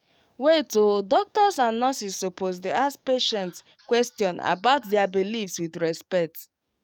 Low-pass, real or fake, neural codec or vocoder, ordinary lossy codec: 19.8 kHz; fake; codec, 44.1 kHz, 7.8 kbps, DAC; none